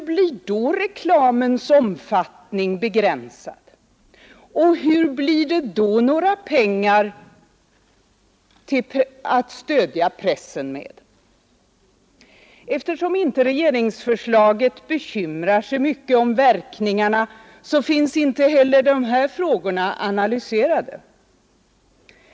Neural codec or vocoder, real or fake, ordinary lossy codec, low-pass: none; real; none; none